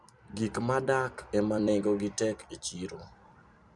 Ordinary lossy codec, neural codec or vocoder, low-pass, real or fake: none; vocoder, 44.1 kHz, 128 mel bands every 256 samples, BigVGAN v2; 10.8 kHz; fake